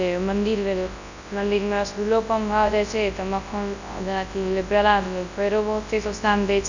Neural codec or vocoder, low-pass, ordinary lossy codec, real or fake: codec, 24 kHz, 0.9 kbps, WavTokenizer, large speech release; 7.2 kHz; none; fake